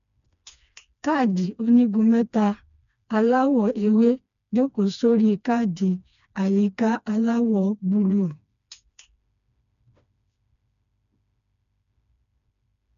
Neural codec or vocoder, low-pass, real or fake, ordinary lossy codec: codec, 16 kHz, 2 kbps, FreqCodec, smaller model; 7.2 kHz; fake; none